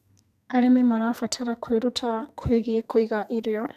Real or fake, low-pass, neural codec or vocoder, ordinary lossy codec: fake; 14.4 kHz; codec, 44.1 kHz, 2.6 kbps, SNAC; none